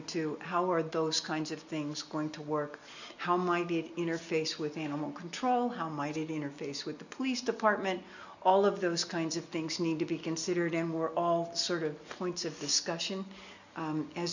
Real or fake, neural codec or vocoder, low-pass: real; none; 7.2 kHz